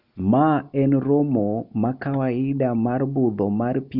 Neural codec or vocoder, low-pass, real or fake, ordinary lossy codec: none; 5.4 kHz; real; none